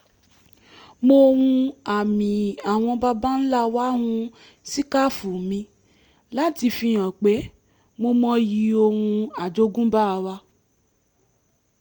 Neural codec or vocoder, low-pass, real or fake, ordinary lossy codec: none; 19.8 kHz; real; Opus, 32 kbps